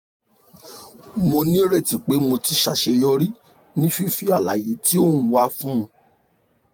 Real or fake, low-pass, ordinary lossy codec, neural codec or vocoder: fake; none; none; vocoder, 48 kHz, 128 mel bands, Vocos